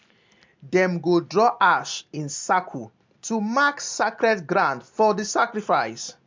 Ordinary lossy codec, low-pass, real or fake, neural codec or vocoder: MP3, 64 kbps; 7.2 kHz; real; none